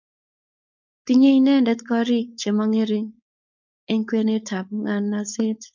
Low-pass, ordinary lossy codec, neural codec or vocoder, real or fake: 7.2 kHz; MP3, 64 kbps; codec, 16 kHz, 4.8 kbps, FACodec; fake